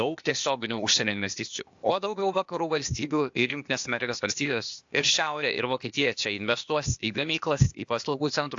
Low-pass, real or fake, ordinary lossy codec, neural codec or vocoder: 7.2 kHz; fake; AAC, 64 kbps; codec, 16 kHz, 0.8 kbps, ZipCodec